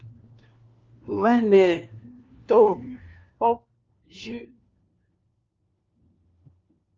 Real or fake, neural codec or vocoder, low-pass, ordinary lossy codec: fake; codec, 16 kHz, 1 kbps, FunCodec, trained on LibriTTS, 50 frames a second; 7.2 kHz; Opus, 24 kbps